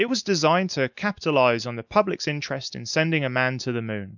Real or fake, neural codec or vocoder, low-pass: real; none; 7.2 kHz